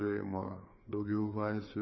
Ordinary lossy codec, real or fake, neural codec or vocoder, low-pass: MP3, 24 kbps; fake; codec, 16 kHz, 4 kbps, FreqCodec, larger model; 7.2 kHz